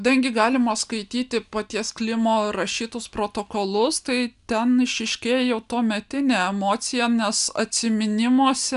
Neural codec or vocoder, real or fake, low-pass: none; real; 10.8 kHz